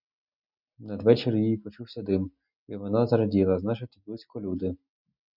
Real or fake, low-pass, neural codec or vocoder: real; 5.4 kHz; none